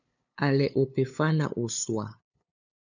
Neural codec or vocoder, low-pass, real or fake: codec, 16 kHz, 8 kbps, FunCodec, trained on LibriTTS, 25 frames a second; 7.2 kHz; fake